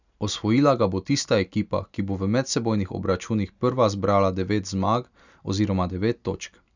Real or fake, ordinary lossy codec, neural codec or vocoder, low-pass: real; none; none; 7.2 kHz